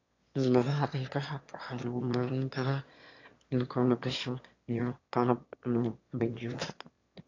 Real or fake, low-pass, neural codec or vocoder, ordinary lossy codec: fake; 7.2 kHz; autoencoder, 22.05 kHz, a latent of 192 numbers a frame, VITS, trained on one speaker; AAC, 48 kbps